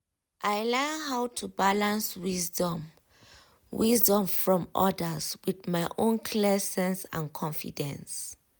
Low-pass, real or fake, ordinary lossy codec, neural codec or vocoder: none; real; none; none